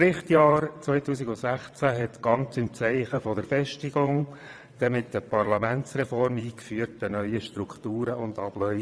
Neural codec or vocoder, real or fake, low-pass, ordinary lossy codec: vocoder, 22.05 kHz, 80 mel bands, WaveNeXt; fake; none; none